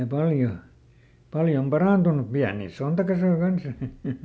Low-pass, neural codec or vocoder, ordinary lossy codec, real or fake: none; none; none; real